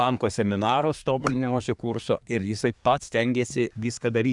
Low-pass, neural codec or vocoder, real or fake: 10.8 kHz; codec, 24 kHz, 1 kbps, SNAC; fake